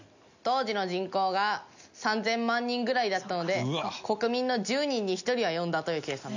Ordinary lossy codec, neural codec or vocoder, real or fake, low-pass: none; none; real; 7.2 kHz